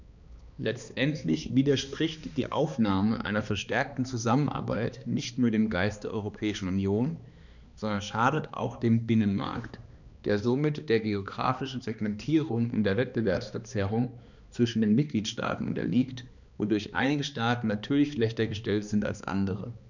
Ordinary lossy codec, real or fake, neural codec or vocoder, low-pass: none; fake; codec, 16 kHz, 2 kbps, X-Codec, HuBERT features, trained on balanced general audio; 7.2 kHz